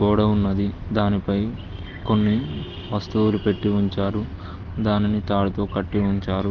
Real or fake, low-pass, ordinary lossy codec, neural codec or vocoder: real; 7.2 kHz; Opus, 16 kbps; none